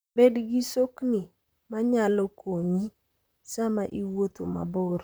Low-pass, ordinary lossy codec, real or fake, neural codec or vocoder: none; none; fake; vocoder, 44.1 kHz, 128 mel bands, Pupu-Vocoder